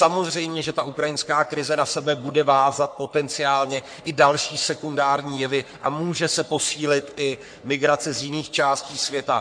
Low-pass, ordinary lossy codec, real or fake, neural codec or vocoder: 9.9 kHz; MP3, 64 kbps; fake; codec, 44.1 kHz, 3.4 kbps, Pupu-Codec